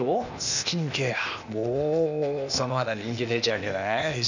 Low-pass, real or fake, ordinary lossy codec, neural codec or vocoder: 7.2 kHz; fake; none; codec, 16 kHz, 0.8 kbps, ZipCodec